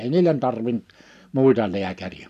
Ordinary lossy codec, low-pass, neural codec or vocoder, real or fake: none; 14.4 kHz; none; real